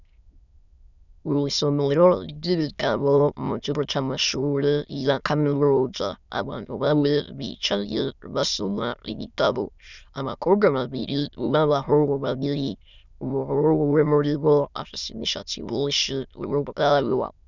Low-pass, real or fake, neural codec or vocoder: 7.2 kHz; fake; autoencoder, 22.05 kHz, a latent of 192 numbers a frame, VITS, trained on many speakers